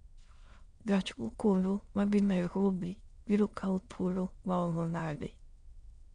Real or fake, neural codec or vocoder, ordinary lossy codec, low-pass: fake; autoencoder, 22.05 kHz, a latent of 192 numbers a frame, VITS, trained on many speakers; MP3, 64 kbps; 9.9 kHz